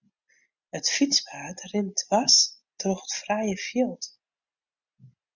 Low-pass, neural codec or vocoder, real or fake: 7.2 kHz; none; real